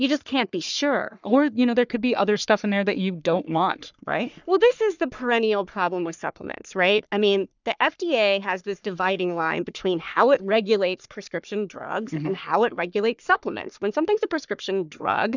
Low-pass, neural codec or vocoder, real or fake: 7.2 kHz; codec, 44.1 kHz, 3.4 kbps, Pupu-Codec; fake